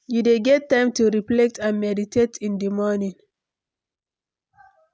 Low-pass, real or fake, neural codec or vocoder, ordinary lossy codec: none; real; none; none